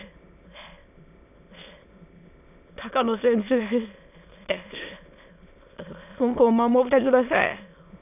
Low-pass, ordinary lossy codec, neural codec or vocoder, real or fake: 3.6 kHz; none; autoencoder, 22.05 kHz, a latent of 192 numbers a frame, VITS, trained on many speakers; fake